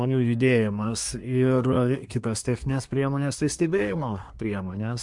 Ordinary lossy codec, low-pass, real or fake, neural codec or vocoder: MP3, 64 kbps; 10.8 kHz; fake; codec, 24 kHz, 1 kbps, SNAC